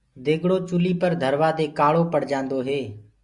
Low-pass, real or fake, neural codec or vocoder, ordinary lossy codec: 10.8 kHz; real; none; Opus, 64 kbps